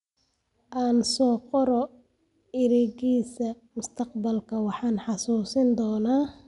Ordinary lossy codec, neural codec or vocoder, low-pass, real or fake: none; none; 10.8 kHz; real